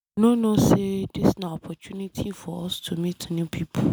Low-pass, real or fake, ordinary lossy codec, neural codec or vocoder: none; real; none; none